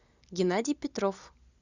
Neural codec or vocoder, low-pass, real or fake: none; 7.2 kHz; real